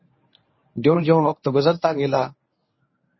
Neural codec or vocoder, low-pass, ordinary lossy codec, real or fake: codec, 24 kHz, 0.9 kbps, WavTokenizer, medium speech release version 1; 7.2 kHz; MP3, 24 kbps; fake